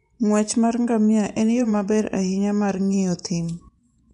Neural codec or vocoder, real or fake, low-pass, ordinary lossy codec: vocoder, 24 kHz, 100 mel bands, Vocos; fake; 10.8 kHz; none